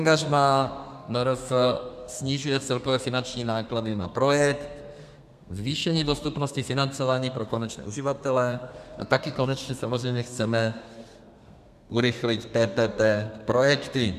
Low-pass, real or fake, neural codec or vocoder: 14.4 kHz; fake; codec, 32 kHz, 1.9 kbps, SNAC